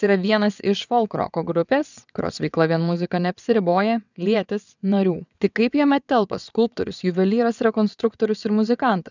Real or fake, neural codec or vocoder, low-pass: fake; vocoder, 22.05 kHz, 80 mel bands, WaveNeXt; 7.2 kHz